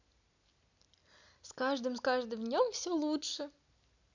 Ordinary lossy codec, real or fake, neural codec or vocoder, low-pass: none; real; none; 7.2 kHz